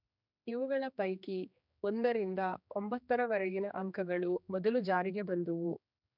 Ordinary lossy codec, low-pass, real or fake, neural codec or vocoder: none; 5.4 kHz; fake; codec, 16 kHz, 2 kbps, X-Codec, HuBERT features, trained on general audio